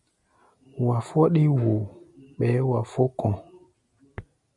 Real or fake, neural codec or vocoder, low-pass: real; none; 10.8 kHz